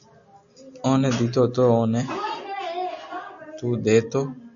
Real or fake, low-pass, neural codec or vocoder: real; 7.2 kHz; none